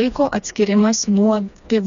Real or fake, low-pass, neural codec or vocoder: fake; 7.2 kHz; codec, 16 kHz, 2 kbps, FreqCodec, smaller model